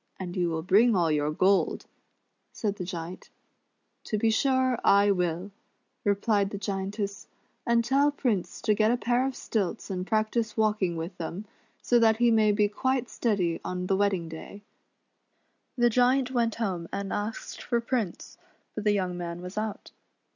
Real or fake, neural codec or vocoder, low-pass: real; none; 7.2 kHz